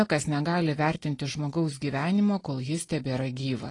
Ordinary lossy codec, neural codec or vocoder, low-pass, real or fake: AAC, 32 kbps; none; 10.8 kHz; real